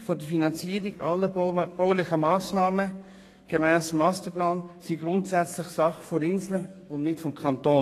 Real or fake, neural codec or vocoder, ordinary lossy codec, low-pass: fake; codec, 32 kHz, 1.9 kbps, SNAC; AAC, 48 kbps; 14.4 kHz